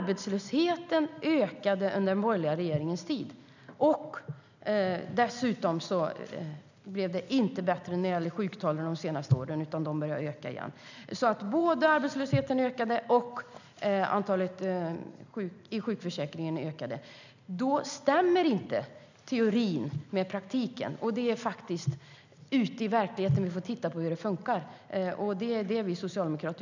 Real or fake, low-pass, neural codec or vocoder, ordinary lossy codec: real; 7.2 kHz; none; none